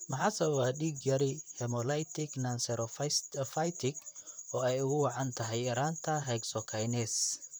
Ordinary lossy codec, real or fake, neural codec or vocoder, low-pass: none; fake; vocoder, 44.1 kHz, 128 mel bands, Pupu-Vocoder; none